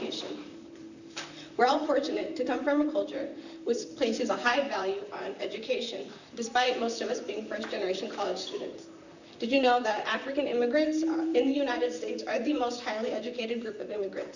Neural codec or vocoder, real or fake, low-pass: vocoder, 44.1 kHz, 128 mel bands, Pupu-Vocoder; fake; 7.2 kHz